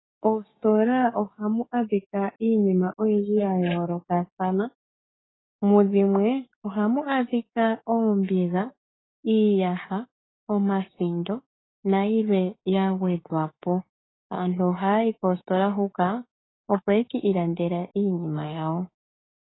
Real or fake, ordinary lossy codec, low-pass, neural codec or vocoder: fake; AAC, 16 kbps; 7.2 kHz; codec, 16 kHz, 6 kbps, DAC